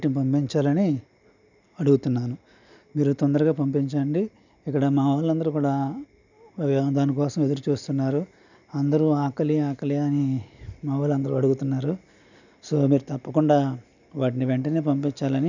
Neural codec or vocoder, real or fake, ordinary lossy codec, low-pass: none; real; none; 7.2 kHz